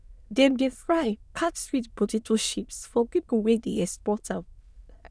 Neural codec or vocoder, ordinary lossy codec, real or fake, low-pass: autoencoder, 22.05 kHz, a latent of 192 numbers a frame, VITS, trained on many speakers; none; fake; none